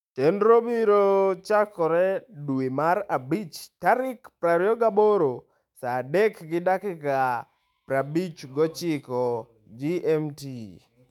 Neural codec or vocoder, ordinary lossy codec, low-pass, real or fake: autoencoder, 48 kHz, 128 numbers a frame, DAC-VAE, trained on Japanese speech; MP3, 96 kbps; 19.8 kHz; fake